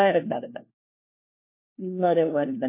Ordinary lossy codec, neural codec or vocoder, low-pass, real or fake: MP3, 24 kbps; codec, 16 kHz, 1 kbps, FunCodec, trained on LibriTTS, 50 frames a second; 3.6 kHz; fake